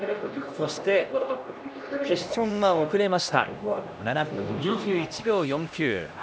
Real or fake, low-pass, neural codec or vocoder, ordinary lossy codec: fake; none; codec, 16 kHz, 1 kbps, X-Codec, HuBERT features, trained on LibriSpeech; none